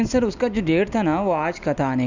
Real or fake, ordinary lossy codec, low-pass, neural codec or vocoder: real; none; 7.2 kHz; none